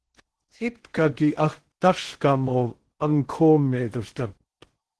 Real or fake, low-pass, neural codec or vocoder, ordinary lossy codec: fake; 10.8 kHz; codec, 16 kHz in and 24 kHz out, 0.6 kbps, FocalCodec, streaming, 2048 codes; Opus, 16 kbps